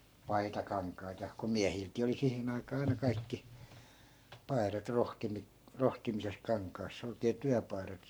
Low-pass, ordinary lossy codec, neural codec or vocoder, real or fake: none; none; codec, 44.1 kHz, 7.8 kbps, Pupu-Codec; fake